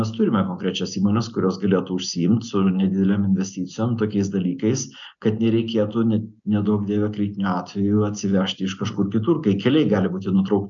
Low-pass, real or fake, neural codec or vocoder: 7.2 kHz; real; none